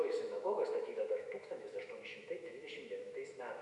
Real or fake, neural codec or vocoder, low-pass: fake; autoencoder, 48 kHz, 128 numbers a frame, DAC-VAE, trained on Japanese speech; 10.8 kHz